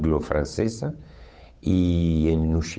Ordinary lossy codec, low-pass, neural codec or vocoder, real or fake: none; none; codec, 16 kHz, 8 kbps, FunCodec, trained on Chinese and English, 25 frames a second; fake